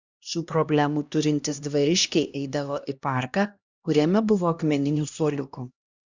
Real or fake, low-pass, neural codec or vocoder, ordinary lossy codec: fake; 7.2 kHz; codec, 16 kHz, 1 kbps, X-Codec, HuBERT features, trained on LibriSpeech; Opus, 64 kbps